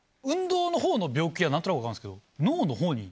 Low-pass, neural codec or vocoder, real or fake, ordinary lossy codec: none; none; real; none